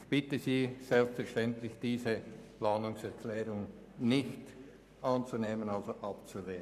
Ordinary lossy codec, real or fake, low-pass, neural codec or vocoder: none; fake; 14.4 kHz; codec, 44.1 kHz, 7.8 kbps, Pupu-Codec